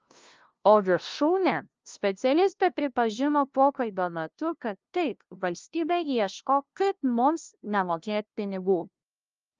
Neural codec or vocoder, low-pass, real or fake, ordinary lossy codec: codec, 16 kHz, 0.5 kbps, FunCodec, trained on LibriTTS, 25 frames a second; 7.2 kHz; fake; Opus, 24 kbps